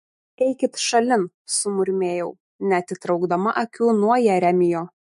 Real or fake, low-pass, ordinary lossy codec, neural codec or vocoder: fake; 14.4 kHz; MP3, 48 kbps; autoencoder, 48 kHz, 128 numbers a frame, DAC-VAE, trained on Japanese speech